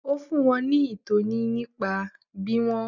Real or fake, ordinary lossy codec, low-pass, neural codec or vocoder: real; none; 7.2 kHz; none